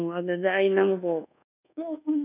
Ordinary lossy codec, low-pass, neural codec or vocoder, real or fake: none; 3.6 kHz; codec, 24 kHz, 1.2 kbps, DualCodec; fake